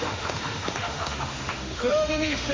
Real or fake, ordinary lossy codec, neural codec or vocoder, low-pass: fake; MP3, 48 kbps; autoencoder, 48 kHz, 32 numbers a frame, DAC-VAE, trained on Japanese speech; 7.2 kHz